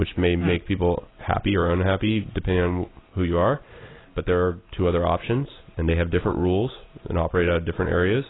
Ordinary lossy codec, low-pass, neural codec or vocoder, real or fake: AAC, 16 kbps; 7.2 kHz; none; real